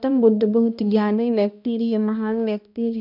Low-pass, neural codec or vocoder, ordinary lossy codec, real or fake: 5.4 kHz; codec, 16 kHz, 1 kbps, X-Codec, HuBERT features, trained on balanced general audio; none; fake